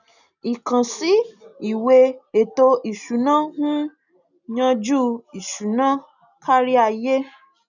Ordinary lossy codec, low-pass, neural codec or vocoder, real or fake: none; 7.2 kHz; none; real